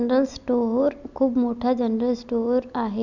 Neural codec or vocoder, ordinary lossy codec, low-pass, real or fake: none; none; 7.2 kHz; real